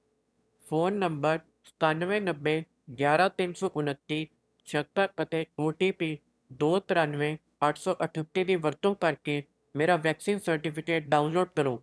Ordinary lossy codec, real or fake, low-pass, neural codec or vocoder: none; fake; none; autoencoder, 22.05 kHz, a latent of 192 numbers a frame, VITS, trained on one speaker